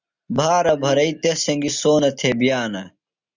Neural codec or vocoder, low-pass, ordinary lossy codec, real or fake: none; 7.2 kHz; Opus, 64 kbps; real